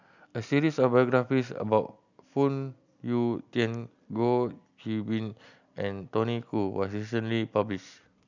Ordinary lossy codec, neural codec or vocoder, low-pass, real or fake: none; none; 7.2 kHz; real